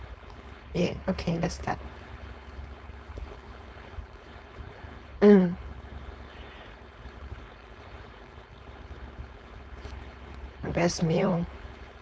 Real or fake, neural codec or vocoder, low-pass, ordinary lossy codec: fake; codec, 16 kHz, 4.8 kbps, FACodec; none; none